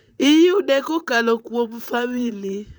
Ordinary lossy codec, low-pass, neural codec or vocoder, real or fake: none; none; vocoder, 44.1 kHz, 128 mel bands, Pupu-Vocoder; fake